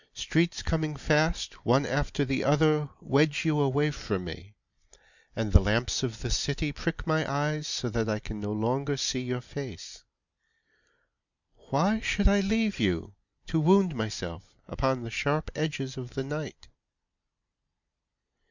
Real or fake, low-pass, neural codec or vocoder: real; 7.2 kHz; none